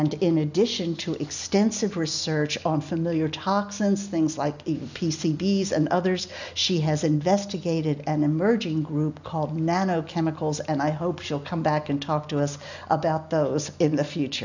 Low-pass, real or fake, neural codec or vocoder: 7.2 kHz; real; none